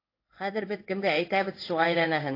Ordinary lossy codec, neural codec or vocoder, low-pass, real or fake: AAC, 32 kbps; codec, 16 kHz in and 24 kHz out, 1 kbps, XY-Tokenizer; 5.4 kHz; fake